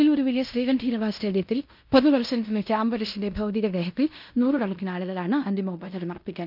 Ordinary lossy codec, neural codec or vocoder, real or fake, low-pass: none; codec, 16 kHz in and 24 kHz out, 0.9 kbps, LongCat-Audio-Codec, fine tuned four codebook decoder; fake; 5.4 kHz